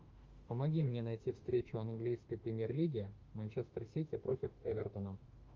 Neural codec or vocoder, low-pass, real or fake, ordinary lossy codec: autoencoder, 48 kHz, 32 numbers a frame, DAC-VAE, trained on Japanese speech; 7.2 kHz; fake; Opus, 32 kbps